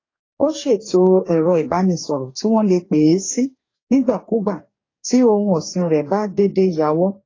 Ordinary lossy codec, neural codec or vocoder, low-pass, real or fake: AAC, 32 kbps; codec, 44.1 kHz, 2.6 kbps, DAC; 7.2 kHz; fake